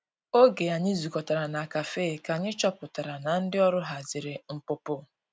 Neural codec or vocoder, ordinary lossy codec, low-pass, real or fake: none; none; none; real